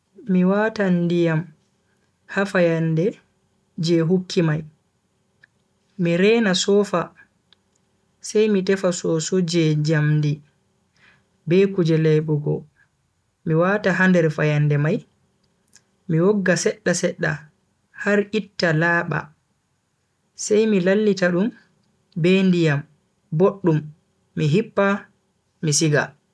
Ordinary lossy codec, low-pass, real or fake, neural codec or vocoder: none; none; real; none